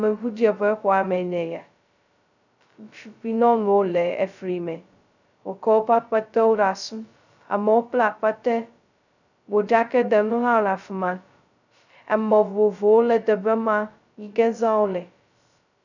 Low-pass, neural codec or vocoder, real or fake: 7.2 kHz; codec, 16 kHz, 0.2 kbps, FocalCodec; fake